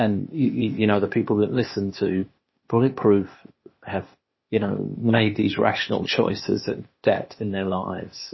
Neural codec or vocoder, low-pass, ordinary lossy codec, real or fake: codec, 16 kHz, 0.8 kbps, ZipCodec; 7.2 kHz; MP3, 24 kbps; fake